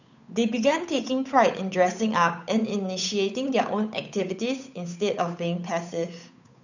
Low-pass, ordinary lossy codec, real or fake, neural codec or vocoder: 7.2 kHz; none; fake; codec, 16 kHz, 8 kbps, FunCodec, trained on Chinese and English, 25 frames a second